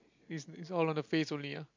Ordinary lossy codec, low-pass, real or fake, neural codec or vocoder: MP3, 64 kbps; 7.2 kHz; real; none